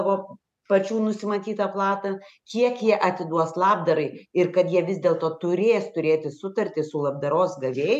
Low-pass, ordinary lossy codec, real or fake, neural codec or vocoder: 14.4 kHz; MP3, 96 kbps; real; none